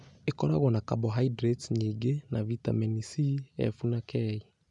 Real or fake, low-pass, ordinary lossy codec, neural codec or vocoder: real; 10.8 kHz; none; none